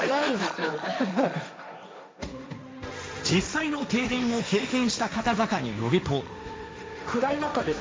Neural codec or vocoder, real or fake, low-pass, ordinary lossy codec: codec, 16 kHz, 1.1 kbps, Voila-Tokenizer; fake; none; none